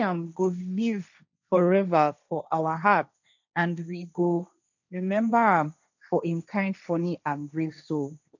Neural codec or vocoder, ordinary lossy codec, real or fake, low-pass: codec, 16 kHz, 1.1 kbps, Voila-Tokenizer; none; fake; 7.2 kHz